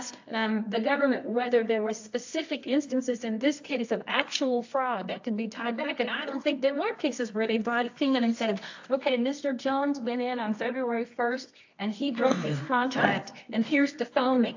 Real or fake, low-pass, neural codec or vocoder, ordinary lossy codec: fake; 7.2 kHz; codec, 24 kHz, 0.9 kbps, WavTokenizer, medium music audio release; AAC, 48 kbps